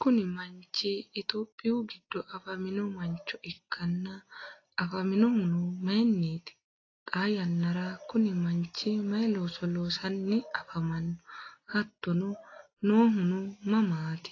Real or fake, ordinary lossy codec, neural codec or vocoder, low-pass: real; AAC, 32 kbps; none; 7.2 kHz